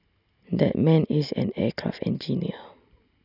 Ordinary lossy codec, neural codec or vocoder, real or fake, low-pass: none; none; real; 5.4 kHz